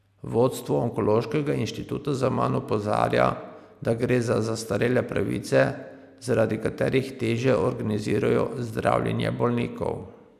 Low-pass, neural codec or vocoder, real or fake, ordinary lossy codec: 14.4 kHz; none; real; none